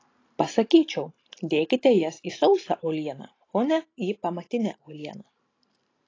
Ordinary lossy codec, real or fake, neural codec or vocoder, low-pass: AAC, 32 kbps; real; none; 7.2 kHz